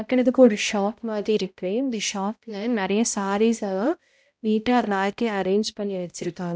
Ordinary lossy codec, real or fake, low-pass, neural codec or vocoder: none; fake; none; codec, 16 kHz, 0.5 kbps, X-Codec, HuBERT features, trained on balanced general audio